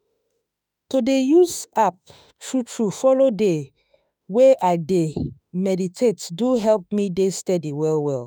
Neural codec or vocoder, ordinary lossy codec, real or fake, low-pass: autoencoder, 48 kHz, 32 numbers a frame, DAC-VAE, trained on Japanese speech; none; fake; none